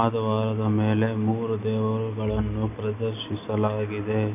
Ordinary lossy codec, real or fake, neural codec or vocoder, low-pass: none; real; none; 3.6 kHz